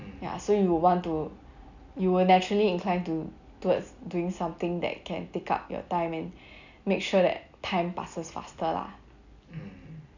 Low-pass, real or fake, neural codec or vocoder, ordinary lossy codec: 7.2 kHz; real; none; none